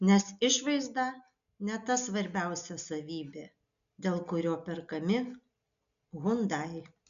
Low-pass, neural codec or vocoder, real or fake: 7.2 kHz; none; real